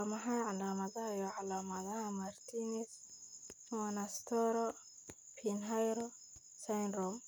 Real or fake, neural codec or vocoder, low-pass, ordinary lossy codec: real; none; none; none